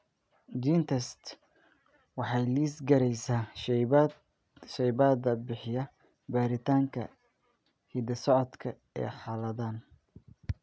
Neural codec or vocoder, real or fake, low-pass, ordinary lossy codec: none; real; none; none